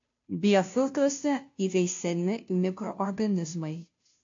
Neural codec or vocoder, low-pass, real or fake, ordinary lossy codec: codec, 16 kHz, 0.5 kbps, FunCodec, trained on Chinese and English, 25 frames a second; 7.2 kHz; fake; AAC, 48 kbps